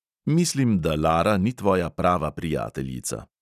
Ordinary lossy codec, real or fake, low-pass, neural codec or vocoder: none; real; 14.4 kHz; none